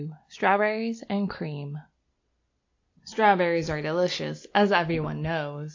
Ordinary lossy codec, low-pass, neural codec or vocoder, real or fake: AAC, 48 kbps; 7.2 kHz; none; real